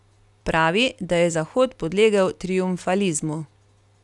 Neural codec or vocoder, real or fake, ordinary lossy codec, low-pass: none; real; none; 10.8 kHz